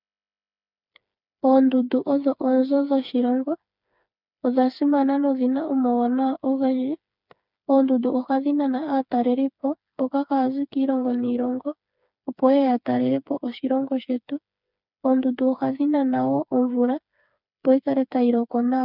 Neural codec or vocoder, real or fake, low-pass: codec, 16 kHz, 4 kbps, FreqCodec, smaller model; fake; 5.4 kHz